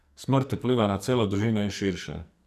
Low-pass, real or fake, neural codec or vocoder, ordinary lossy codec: 14.4 kHz; fake; codec, 44.1 kHz, 2.6 kbps, SNAC; none